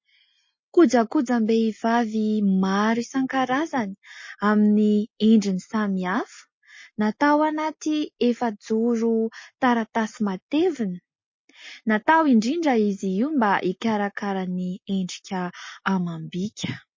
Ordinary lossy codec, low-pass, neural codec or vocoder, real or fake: MP3, 32 kbps; 7.2 kHz; none; real